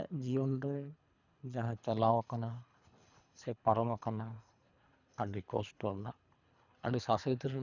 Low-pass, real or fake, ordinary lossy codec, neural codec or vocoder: 7.2 kHz; fake; none; codec, 24 kHz, 3 kbps, HILCodec